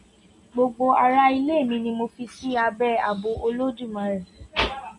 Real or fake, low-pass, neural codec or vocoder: real; 10.8 kHz; none